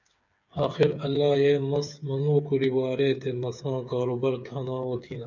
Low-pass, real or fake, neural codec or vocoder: 7.2 kHz; fake; codec, 16 kHz, 8 kbps, FreqCodec, smaller model